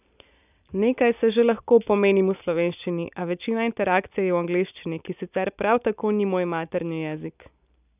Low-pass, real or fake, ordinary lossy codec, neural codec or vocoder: 3.6 kHz; real; none; none